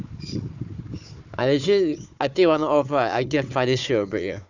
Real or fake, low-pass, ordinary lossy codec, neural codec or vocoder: fake; 7.2 kHz; none; codec, 16 kHz, 4 kbps, FunCodec, trained on Chinese and English, 50 frames a second